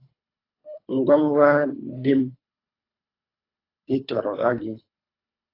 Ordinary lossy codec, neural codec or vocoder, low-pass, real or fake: AAC, 24 kbps; codec, 24 kHz, 3 kbps, HILCodec; 5.4 kHz; fake